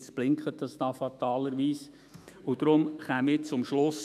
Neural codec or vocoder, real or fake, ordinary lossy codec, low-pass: autoencoder, 48 kHz, 128 numbers a frame, DAC-VAE, trained on Japanese speech; fake; none; 14.4 kHz